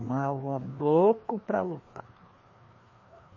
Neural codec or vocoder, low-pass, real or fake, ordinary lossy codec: codec, 16 kHz, 2 kbps, FreqCodec, larger model; 7.2 kHz; fake; MP3, 32 kbps